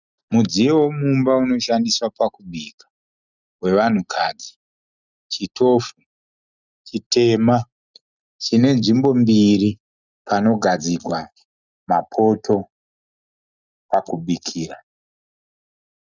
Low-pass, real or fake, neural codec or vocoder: 7.2 kHz; real; none